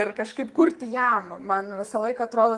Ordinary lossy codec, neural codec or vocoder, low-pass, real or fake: Opus, 24 kbps; codec, 44.1 kHz, 2.6 kbps, SNAC; 10.8 kHz; fake